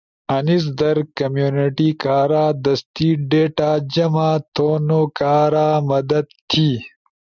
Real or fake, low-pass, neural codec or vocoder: real; 7.2 kHz; none